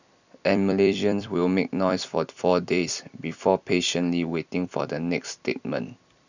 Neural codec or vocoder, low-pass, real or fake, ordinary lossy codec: vocoder, 44.1 kHz, 128 mel bands every 256 samples, BigVGAN v2; 7.2 kHz; fake; none